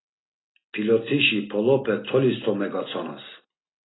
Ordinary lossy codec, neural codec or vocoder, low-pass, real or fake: AAC, 16 kbps; none; 7.2 kHz; real